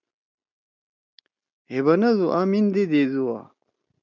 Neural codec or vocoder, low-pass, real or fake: none; 7.2 kHz; real